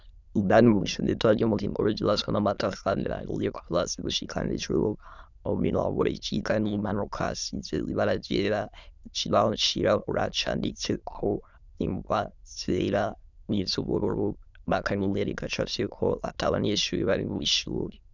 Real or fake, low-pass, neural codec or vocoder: fake; 7.2 kHz; autoencoder, 22.05 kHz, a latent of 192 numbers a frame, VITS, trained on many speakers